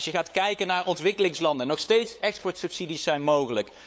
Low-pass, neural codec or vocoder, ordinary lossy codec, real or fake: none; codec, 16 kHz, 8 kbps, FunCodec, trained on LibriTTS, 25 frames a second; none; fake